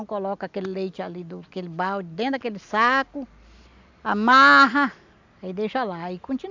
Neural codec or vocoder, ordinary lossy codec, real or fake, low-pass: none; MP3, 64 kbps; real; 7.2 kHz